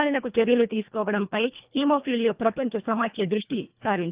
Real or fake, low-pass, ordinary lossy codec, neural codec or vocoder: fake; 3.6 kHz; Opus, 32 kbps; codec, 24 kHz, 1.5 kbps, HILCodec